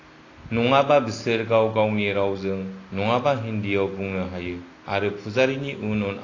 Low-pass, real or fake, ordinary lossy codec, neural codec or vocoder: 7.2 kHz; real; AAC, 32 kbps; none